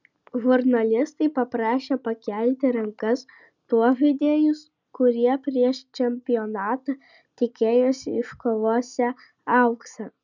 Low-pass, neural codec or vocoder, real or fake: 7.2 kHz; none; real